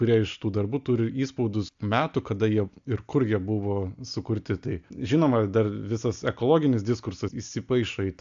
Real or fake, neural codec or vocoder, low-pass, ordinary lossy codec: real; none; 7.2 kHz; AAC, 64 kbps